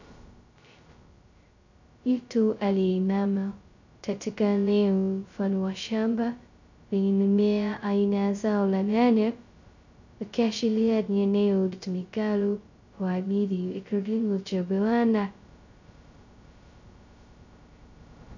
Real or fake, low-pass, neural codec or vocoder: fake; 7.2 kHz; codec, 16 kHz, 0.2 kbps, FocalCodec